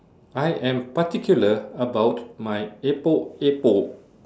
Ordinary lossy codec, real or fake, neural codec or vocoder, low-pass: none; real; none; none